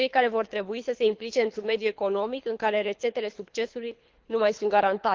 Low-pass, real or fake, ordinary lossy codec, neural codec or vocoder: 7.2 kHz; fake; Opus, 32 kbps; codec, 24 kHz, 3 kbps, HILCodec